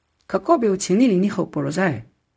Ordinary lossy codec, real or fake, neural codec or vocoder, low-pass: none; fake; codec, 16 kHz, 0.4 kbps, LongCat-Audio-Codec; none